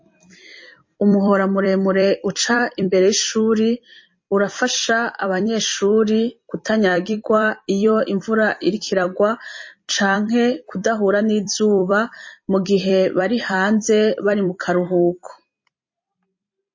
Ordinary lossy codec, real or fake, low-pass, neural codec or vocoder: MP3, 32 kbps; fake; 7.2 kHz; vocoder, 44.1 kHz, 128 mel bands every 256 samples, BigVGAN v2